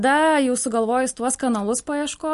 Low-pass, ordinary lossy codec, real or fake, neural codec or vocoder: 14.4 kHz; MP3, 48 kbps; real; none